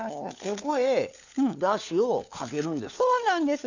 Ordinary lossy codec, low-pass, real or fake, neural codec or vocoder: none; 7.2 kHz; fake; codec, 16 kHz, 4 kbps, FunCodec, trained on LibriTTS, 50 frames a second